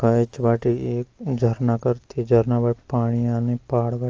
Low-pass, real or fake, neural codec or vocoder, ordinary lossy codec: 7.2 kHz; real; none; Opus, 24 kbps